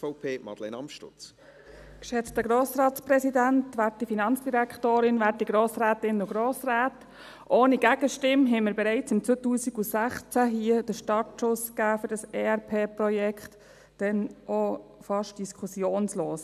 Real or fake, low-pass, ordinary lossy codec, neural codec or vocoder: real; 14.4 kHz; none; none